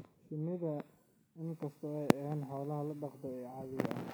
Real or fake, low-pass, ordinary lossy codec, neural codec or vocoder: real; none; none; none